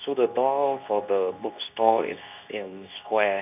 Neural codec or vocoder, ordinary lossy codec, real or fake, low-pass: codec, 24 kHz, 0.9 kbps, WavTokenizer, medium speech release version 2; none; fake; 3.6 kHz